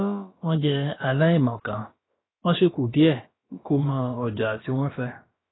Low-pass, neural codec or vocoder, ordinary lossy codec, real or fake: 7.2 kHz; codec, 16 kHz, about 1 kbps, DyCAST, with the encoder's durations; AAC, 16 kbps; fake